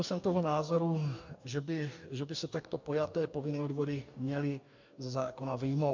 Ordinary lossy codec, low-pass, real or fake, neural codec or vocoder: MP3, 64 kbps; 7.2 kHz; fake; codec, 44.1 kHz, 2.6 kbps, DAC